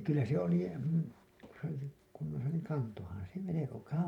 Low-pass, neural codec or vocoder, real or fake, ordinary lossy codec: 19.8 kHz; vocoder, 44.1 kHz, 128 mel bands every 512 samples, BigVGAN v2; fake; none